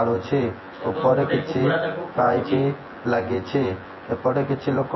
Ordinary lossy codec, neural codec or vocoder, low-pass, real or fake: MP3, 24 kbps; vocoder, 24 kHz, 100 mel bands, Vocos; 7.2 kHz; fake